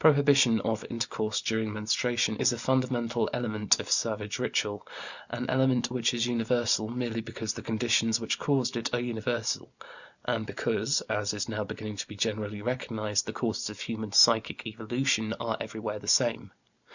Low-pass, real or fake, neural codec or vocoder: 7.2 kHz; real; none